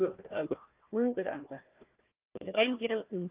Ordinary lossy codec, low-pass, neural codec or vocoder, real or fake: Opus, 24 kbps; 3.6 kHz; codec, 16 kHz, 1 kbps, FunCodec, trained on Chinese and English, 50 frames a second; fake